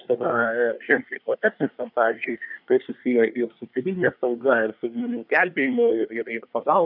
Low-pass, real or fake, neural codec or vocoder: 5.4 kHz; fake; codec, 24 kHz, 1 kbps, SNAC